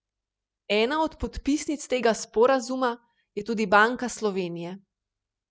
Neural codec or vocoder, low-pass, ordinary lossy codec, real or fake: none; none; none; real